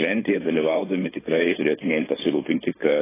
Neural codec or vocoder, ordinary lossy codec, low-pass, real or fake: codec, 16 kHz, 4.8 kbps, FACodec; AAC, 16 kbps; 3.6 kHz; fake